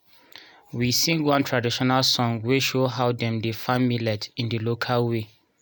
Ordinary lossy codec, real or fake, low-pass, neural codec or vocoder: none; real; none; none